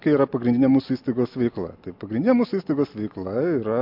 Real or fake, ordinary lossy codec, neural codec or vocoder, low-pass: real; MP3, 48 kbps; none; 5.4 kHz